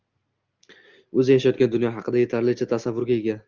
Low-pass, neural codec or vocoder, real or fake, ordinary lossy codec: 7.2 kHz; none; real; Opus, 24 kbps